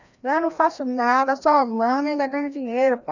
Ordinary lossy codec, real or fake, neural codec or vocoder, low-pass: none; fake; codec, 16 kHz, 1 kbps, FreqCodec, larger model; 7.2 kHz